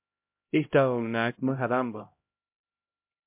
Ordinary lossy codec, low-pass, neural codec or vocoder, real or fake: MP3, 32 kbps; 3.6 kHz; codec, 16 kHz, 0.5 kbps, X-Codec, HuBERT features, trained on LibriSpeech; fake